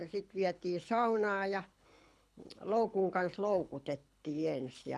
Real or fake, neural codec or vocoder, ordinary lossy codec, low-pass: fake; codec, 24 kHz, 6 kbps, HILCodec; none; none